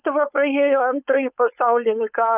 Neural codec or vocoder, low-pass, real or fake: codec, 16 kHz, 4.8 kbps, FACodec; 3.6 kHz; fake